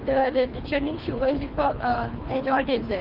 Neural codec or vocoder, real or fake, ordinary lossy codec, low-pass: codec, 24 kHz, 3 kbps, HILCodec; fake; Opus, 24 kbps; 5.4 kHz